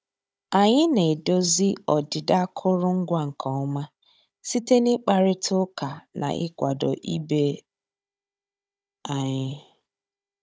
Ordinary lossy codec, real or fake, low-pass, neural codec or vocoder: none; fake; none; codec, 16 kHz, 16 kbps, FunCodec, trained on Chinese and English, 50 frames a second